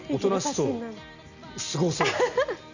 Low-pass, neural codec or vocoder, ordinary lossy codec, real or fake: 7.2 kHz; none; none; real